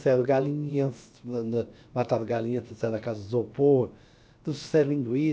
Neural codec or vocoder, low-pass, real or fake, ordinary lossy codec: codec, 16 kHz, about 1 kbps, DyCAST, with the encoder's durations; none; fake; none